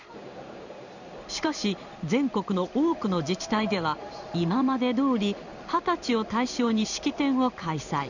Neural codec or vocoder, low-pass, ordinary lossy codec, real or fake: codec, 16 kHz in and 24 kHz out, 1 kbps, XY-Tokenizer; 7.2 kHz; none; fake